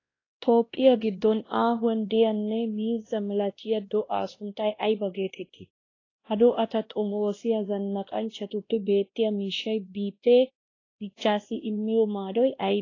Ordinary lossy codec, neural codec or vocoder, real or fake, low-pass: AAC, 32 kbps; codec, 16 kHz, 1 kbps, X-Codec, WavLM features, trained on Multilingual LibriSpeech; fake; 7.2 kHz